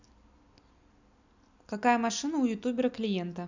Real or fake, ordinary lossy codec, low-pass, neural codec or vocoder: real; none; 7.2 kHz; none